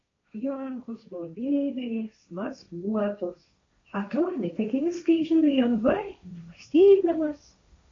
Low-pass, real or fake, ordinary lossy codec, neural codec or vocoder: 7.2 kHz; fake; AAC, 48 kbps; codec, 16 kHz, 1.1 kbps, Voila-Tokenizer